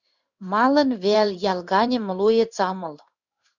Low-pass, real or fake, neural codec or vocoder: 7.2 kHz; fake; codec, 16 kHz in and 24 kHz out, 1 kbps, XY-Tokenizer